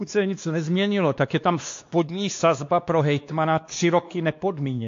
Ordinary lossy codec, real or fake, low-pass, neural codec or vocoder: AAC, 48 kbps; fake; 7.2 kHz; codec, 16 kHz, 2 kbps, X-Codec, WavLM features, trained on Multilingual LibriSpeech